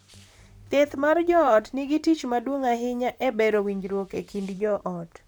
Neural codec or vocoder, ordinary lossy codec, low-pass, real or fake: vocoder, 44.1 kHz, 128 mel bands, Pupu-Vocoder; none; none; fake